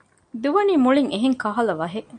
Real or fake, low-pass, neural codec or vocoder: real; 9.9 kHz; none